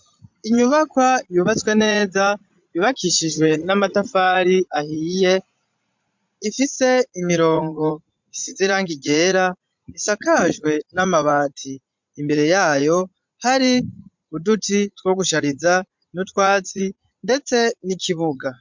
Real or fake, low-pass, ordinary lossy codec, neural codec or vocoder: fake; 7.2 kHz; MP3, 64 kbps; vocoder, 44.1 kHz, 80 mel bands, Vocos